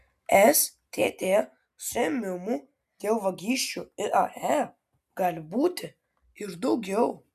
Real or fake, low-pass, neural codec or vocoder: real; 14.4 kHz; none